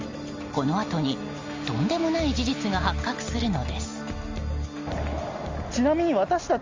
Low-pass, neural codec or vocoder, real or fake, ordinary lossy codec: 7.2 kHz; none; real; Opus, 32 kbps